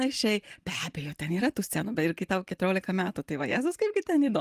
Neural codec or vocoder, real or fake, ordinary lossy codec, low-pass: none; real; Opus, 32 kbps; 14.4 kHz